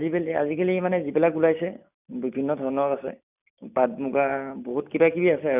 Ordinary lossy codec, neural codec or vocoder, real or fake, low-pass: none; none; real; 3.6 kHz